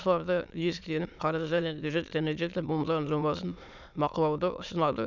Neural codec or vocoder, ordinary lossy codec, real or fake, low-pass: autoencoder, 22.05 kHz, a latent of 192 numbers a frame, VITS, trained on many speakers; none; fake; 7.2 kHz